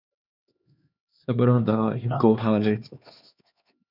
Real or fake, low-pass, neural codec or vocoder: fake; 5.4 kHz; codec, 16 kHz, 2 kbps, X-Codec, HuBERT features, trained on LibriSpeech